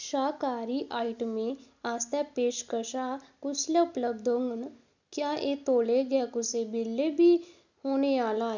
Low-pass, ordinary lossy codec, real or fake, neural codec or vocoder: 7.2 kHz; none; real; none